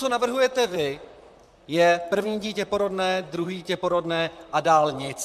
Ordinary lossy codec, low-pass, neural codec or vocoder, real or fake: MP3, 96 kbps; 14.4 kHz; vocoder, 44.1 kHz, 128 mel bands, Pupu-Vocoder; fake